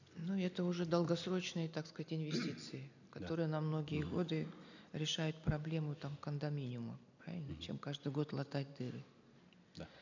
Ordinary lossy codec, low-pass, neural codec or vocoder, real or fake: none; 7.2 kHz; none; real